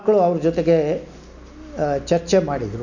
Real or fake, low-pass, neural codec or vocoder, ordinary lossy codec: real; 7.2 kHz; none; none